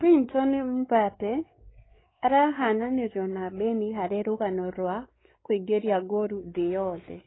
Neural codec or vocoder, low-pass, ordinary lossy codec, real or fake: codec, 16 kHz, 4 kbps, X-Codec, WavLM features, trained on Multilingual LibriSpeech; 7.2 kHz; AAC, 16 kbps; fake